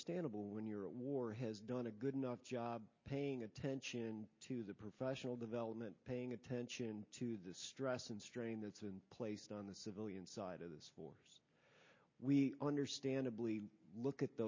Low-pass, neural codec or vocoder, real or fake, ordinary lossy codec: 7.2 kHz; none; real; MP3, 32 kbps